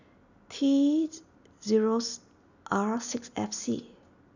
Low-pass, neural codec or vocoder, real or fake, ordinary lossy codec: 7.2 kHz; none; real; none